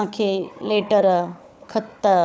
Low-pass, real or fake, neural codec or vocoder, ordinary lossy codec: none; fake; codec, 16 kHz, 4 kbps, FunCodec, trained on Chinese and English, 50 frames a second; none